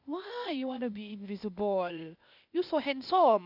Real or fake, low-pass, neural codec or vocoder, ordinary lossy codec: fake; 5.4 kHz; codec, 16 kHz, 0.8 kbps, ZipCodec; none